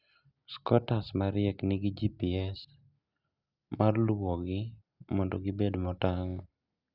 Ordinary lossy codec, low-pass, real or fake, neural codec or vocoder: Opus, 64 kbps; 5.4 kHz; real; none